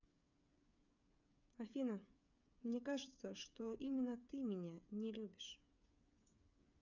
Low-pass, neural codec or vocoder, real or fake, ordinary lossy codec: 7.2 kHz; codec, 16 kHz, 8 kbps, FreqCodec, smaller model; fake; none